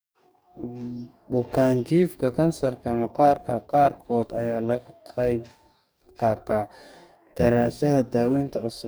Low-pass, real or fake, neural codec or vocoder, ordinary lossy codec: none; fake; codec, 44.1 kHz, 2.6 kbps, DAC; none